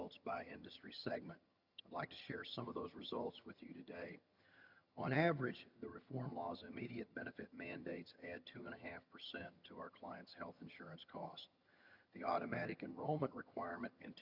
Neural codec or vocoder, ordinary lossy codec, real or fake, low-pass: vocoder, 22.05 kHz, 80 mel bands, HiFi-GAN; Opus, 64 kbps; fake; 5.4 kHz